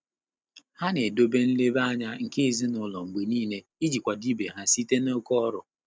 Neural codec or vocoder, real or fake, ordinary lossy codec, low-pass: none; real; none; none